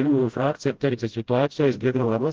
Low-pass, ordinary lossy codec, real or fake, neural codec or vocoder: 7.2 kHz; Opus, 16 kbps; fake; codec, 16 kHz, 0.5 kbps, FreqCodec, smaller model